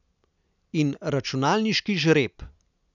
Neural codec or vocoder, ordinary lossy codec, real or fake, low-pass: none; none; real; 7.2 kHz